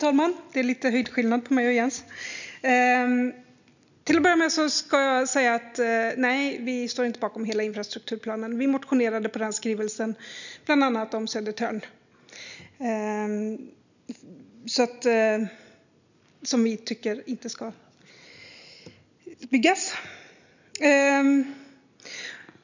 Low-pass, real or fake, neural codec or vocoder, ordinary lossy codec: 7.2 kHz; real; none; none